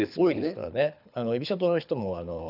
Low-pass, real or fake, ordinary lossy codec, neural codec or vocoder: 5.4 kHz; fake; none; codec, 24 kHz, 6 kbps, HILCodec